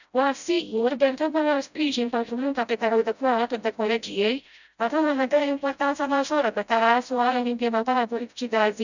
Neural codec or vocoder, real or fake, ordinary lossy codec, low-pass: codec, 16 kHz, 0.5 kbps, FreqCodec, smaller model; fake; none; 7.2 kHz